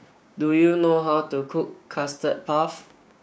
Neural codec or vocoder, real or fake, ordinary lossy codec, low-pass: codec, 16 kHz, 6 kbps, DAC; fake; none; none